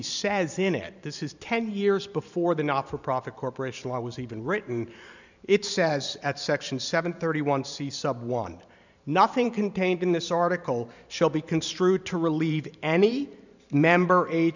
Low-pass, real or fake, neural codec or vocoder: 7.2 kHz; fake; vocoder, 44.1 kHz, 128 mel bands every 512 samples, BigVGAN v2